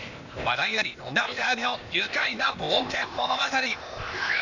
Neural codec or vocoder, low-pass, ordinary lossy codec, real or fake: codec, 16 kHz, 0.8 kbps, ZipCodec; 7.2 kHz; none; fake